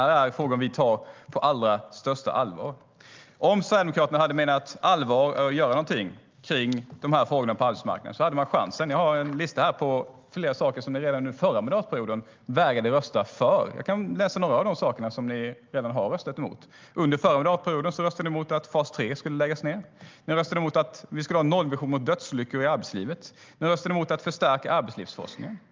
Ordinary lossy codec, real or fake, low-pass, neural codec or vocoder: Opus, 24 kbps; real; 7.2 kHz; none